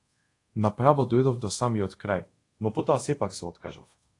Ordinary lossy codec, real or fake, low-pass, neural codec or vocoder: AAC, 48 kbps; fake; 10.8 kHz; codec, 24 kHz, 0.5 kbps, DualCodec